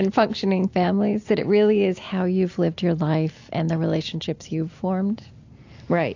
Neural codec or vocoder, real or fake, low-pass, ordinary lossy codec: none; real; 7.2 kHz; AAC, 48 kbps